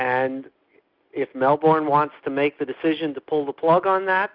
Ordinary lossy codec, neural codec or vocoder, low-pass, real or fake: Opus, 64 kbps; none; 5.4 kHz; real